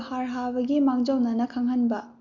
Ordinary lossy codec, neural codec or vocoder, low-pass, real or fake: none; none; 7.2 kHz; real